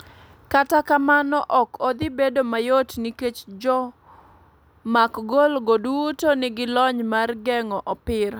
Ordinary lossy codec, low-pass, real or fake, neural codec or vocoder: none; none; real; none